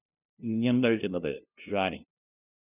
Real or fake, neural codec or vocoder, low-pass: fake; codec, 16 kHz, 0.5 kbps, FunCodec, trained on LibriTTS, 25 frames a second; 3.6 kHz